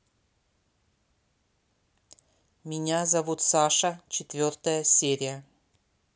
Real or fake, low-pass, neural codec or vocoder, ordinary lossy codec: real; none; none; none